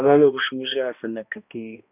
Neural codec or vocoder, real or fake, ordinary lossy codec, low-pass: codec, 16 kHz, 1 kbps, X-Codec, HuBERT features, trained on balanced general audio; fake; none; 3.6 kHz